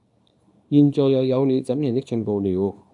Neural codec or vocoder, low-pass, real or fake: codec, 24 kHz, 0.9 kbps, WavTokenizer, small release; 10.8 kHz; fake